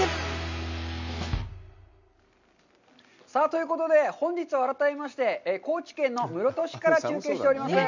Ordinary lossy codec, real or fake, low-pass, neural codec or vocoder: none; real; 7.2 kHz; none